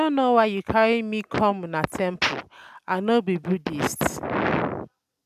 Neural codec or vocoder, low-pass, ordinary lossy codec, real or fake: none; 14.4 kHz; none; real